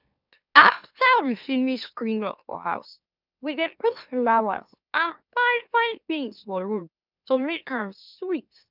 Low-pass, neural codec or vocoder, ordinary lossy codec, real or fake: 5.4 kHz; autoencoder, 44.1 kHz, a latent of 192 numbers a frame, MeloTTS; none; fake